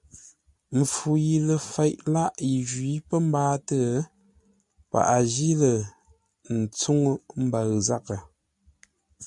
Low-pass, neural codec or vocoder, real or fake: 10.8 kHz; none; real